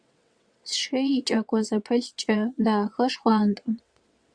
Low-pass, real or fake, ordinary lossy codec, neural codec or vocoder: 9.9 kHz; fake; Opus, 64 kbps; vocoder, 22.05 kHz, 80 mel bands, WaveNeXt